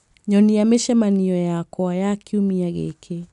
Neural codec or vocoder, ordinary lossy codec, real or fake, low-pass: none; none; real; 10.8 kHz